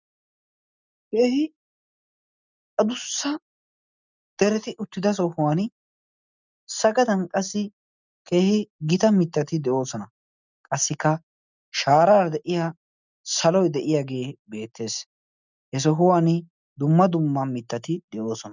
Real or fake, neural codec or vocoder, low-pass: real; none; 7.2 kHz